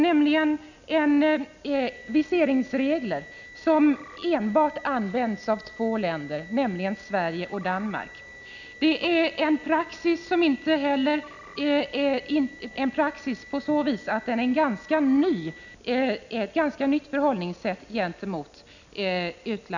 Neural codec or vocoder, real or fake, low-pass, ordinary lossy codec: none; real; 7.2 kHz; none